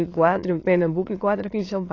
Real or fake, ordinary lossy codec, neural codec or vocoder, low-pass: fake; AAC, 32 kbps; autoencoder, 22.05 kHz, a latent of 192 numbers a frame, VITS, trained on many speakers; 7.2 kHz